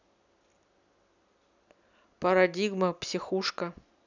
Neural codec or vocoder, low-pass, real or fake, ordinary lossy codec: none; 7.2 kHz; real; none